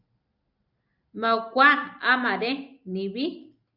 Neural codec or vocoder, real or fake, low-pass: none; real; 5.4 kHz